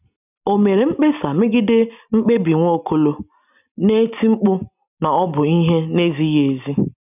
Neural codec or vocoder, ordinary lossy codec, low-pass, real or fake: none; none; 3.6 kHz; real